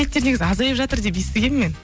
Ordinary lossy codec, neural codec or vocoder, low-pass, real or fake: none; none; none; real